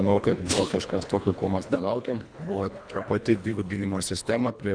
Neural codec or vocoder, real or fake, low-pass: codec, 24 kHz, 1.5 kbps, HILCodec; fake; 9.9 kHz